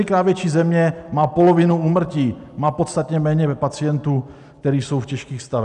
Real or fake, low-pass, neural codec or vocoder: real; 10.8 kHz; none